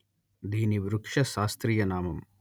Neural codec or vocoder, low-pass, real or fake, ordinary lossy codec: none; none; real; none